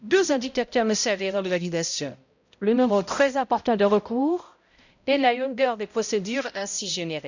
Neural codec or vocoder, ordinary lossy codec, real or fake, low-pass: codec, 16 kHz, 0.5 kbps, X-Codec, HuBERT features, trained on balanced general audio; none; fake; 7.2 kHz